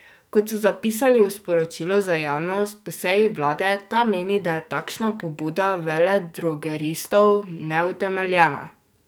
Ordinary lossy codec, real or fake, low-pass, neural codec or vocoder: none; fake; none; codec, 44.1 kHz, 2.6 kbps, SNAC